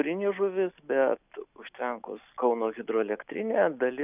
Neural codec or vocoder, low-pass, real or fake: none; 3.6 kHz; real